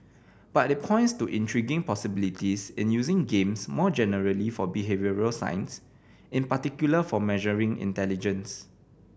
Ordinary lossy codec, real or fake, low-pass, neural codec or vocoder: none; real; none; none